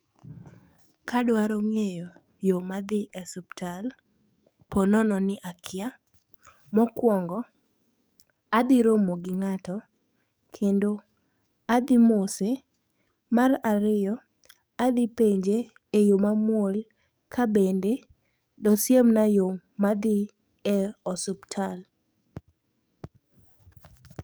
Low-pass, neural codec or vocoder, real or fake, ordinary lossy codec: none; codec, 44.1 kHz, 7.8 kbps, DAC; fake; none